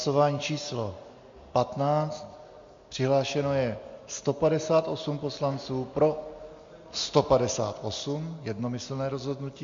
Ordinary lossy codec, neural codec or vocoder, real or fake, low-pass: MP3, 48 kbps; none; real; 7.2 kHz